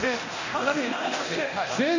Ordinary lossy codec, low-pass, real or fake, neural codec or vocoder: none; 7.2 kHz; fake; codec, 24 kHz, 0.9 kbps, DualCodec